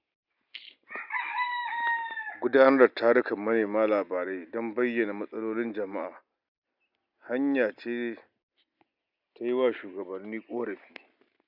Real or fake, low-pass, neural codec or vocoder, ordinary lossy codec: real; 5.4 kHz; none; none